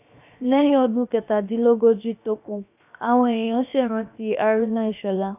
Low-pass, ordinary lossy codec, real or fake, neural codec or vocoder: 3.6 kHz; none; fake; codec, 16 kHz, 0.7 kbps, FocalCodec